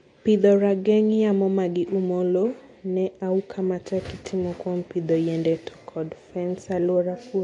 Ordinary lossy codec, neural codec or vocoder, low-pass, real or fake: MP3, 48 kbps; none; 10.8 kHz; real